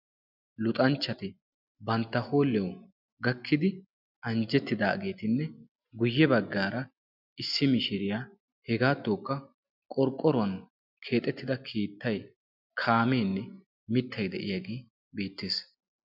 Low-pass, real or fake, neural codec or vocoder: 5.4 kHz; real; none